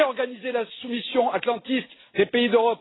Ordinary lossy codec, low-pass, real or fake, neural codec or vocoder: AAC, 16 kbps; 7.2 kHz; real; none